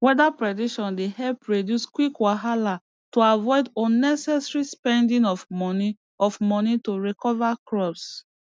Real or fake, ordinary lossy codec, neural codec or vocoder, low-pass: real; none; none; none